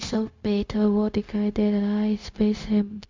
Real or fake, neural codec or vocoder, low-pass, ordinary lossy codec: fake; codec, 16 kHz, 0.4 kbps, LongCat-Audio-Codec; 7.2 kHz; MP3, 64 kbps